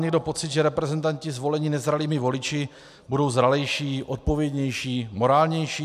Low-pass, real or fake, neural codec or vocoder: 14.4 kHz; real; none